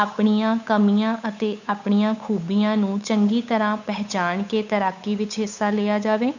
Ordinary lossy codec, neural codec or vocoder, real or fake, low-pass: none; codec, 16 kHz, 8 kbps, FunCodec, trained on Chinese and English, 25 frames a second; fake; 7.2 kHz